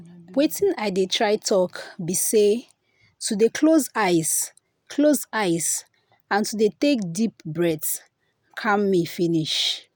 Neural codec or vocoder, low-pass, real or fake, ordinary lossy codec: none; none; real; none